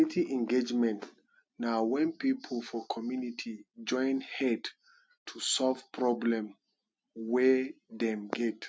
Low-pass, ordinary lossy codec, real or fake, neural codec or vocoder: none; none; real; none